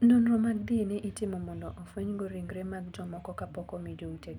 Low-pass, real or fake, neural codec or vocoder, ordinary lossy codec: 19.8 kHz; real; none; none